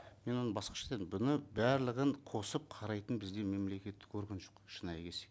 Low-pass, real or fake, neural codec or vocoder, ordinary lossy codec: none; real; none; none